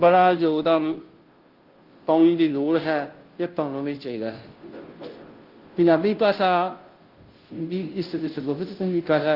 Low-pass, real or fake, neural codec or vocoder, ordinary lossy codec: 5.4 kHz; fake; codec, 16 kHz, 0.5 kbps, FunCodec, trained on Chinese and English, 25 frames a second; Opus, 16 kbps